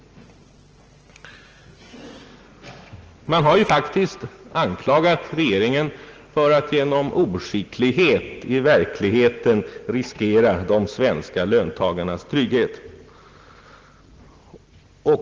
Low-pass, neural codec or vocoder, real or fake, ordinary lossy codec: 7.2 kHz; none; real; Opus, 16 kbps